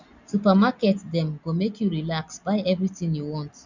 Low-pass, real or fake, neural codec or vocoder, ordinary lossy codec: 7.2 kHz; real; none; none